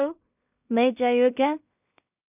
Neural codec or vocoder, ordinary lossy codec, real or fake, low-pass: codec, 16 kHz in and 24 kHz out, 0.4 kbps, LongCat-Audio-Codec, two codebook decoder; none; fake; 3.6 kHz